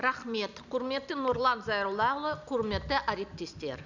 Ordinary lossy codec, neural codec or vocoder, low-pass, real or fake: none; none; 7.2 kHz; real